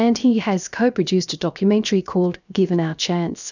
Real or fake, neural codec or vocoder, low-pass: fake; codec, 16 kHz, 0.7 kbps, FocalCodec; 7.2 kHz